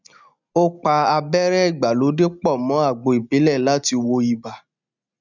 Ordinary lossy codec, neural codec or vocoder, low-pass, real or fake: none; none; 7.2 kHz; real